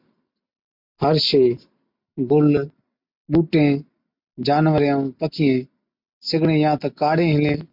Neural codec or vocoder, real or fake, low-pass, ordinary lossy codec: none; real; 5.4 kHz; MP3, 48 kbps